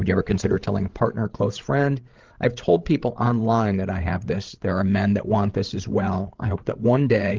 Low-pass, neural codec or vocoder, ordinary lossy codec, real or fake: 7.2 kHz; codec, 16 kHz, 16 kbps, FreqCodec, larger model; Opus, 16 kbps; fake